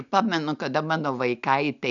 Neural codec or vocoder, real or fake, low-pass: none; real; 7.2 kHz